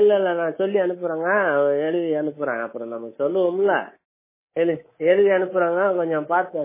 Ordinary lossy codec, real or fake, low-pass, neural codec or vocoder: MP3, 16 kbps; fake; 3.6 kHz; codec, 24 kHz, 3.1 kbps, DualCodec